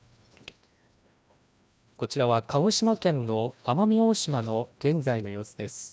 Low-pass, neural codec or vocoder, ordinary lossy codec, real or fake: none; codec, 16 kHz, 1 kbps, FreqCodec, larger model; none; fake